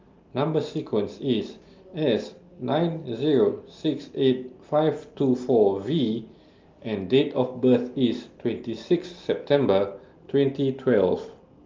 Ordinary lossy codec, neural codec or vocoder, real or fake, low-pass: Opus, 32 kbps; none; real; 7.2 kHz